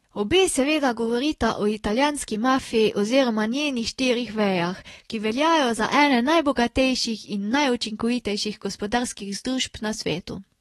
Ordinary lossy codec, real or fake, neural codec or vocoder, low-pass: AAC, 32 kbps; fake; autoencoder, 48 kHz, 128 numbers a frame, DAC-VAE, trained on Japanese speech; 19.8 kHz